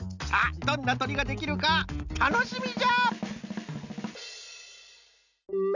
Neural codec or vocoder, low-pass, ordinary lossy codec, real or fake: none; 7.2 kHz; none; real